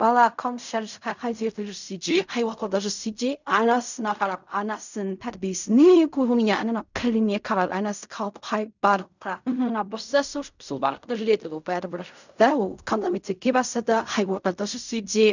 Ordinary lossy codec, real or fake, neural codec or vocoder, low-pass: none; fake; codec, 16 kHz in and 24 kHz out, 0.4 kbps, LongCat-Audio-Codec, fine tuned four codebook decoder; 7.2 kHz